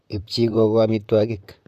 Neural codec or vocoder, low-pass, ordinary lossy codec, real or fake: vocoder, 44.1 kHz, 128 mel bands, Pupu-Vocoder; 14.4 kHz; none; fake